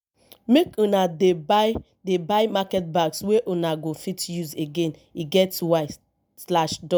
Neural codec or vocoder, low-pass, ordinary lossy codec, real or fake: none; none; none; real